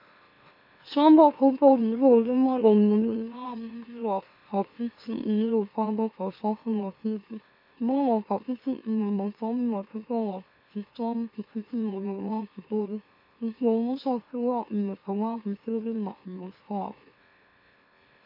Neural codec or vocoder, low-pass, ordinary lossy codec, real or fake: autoencoder, 44.1 kHz, a latent of 192 numbers a frame, MeloTTS; 5.4 kHz; MP3, 32 kbps; fake